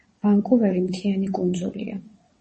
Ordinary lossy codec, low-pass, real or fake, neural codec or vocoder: MP3, 32 kbps; 9.9 kHz; fake; vocoder, 22.05 kHz, 80 mel bands, WaveNeXt